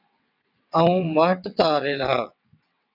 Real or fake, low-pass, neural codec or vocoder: fake; 5.4 kHz; vocoder, 22.05 kHz, 80 mel bands, WaveNeXt